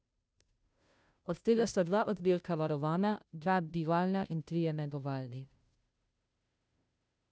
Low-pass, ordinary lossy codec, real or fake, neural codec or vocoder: none; none; fake; codec, 16 kHz, 0.5 kbps, FunCodec, trained on Chinese and English, 25 frames a second